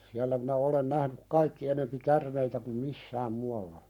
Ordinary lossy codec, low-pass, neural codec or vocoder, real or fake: none; 19.8 kHz; codec, 44.1 kHz, 7.8 kbps, Pupu-Codec; fake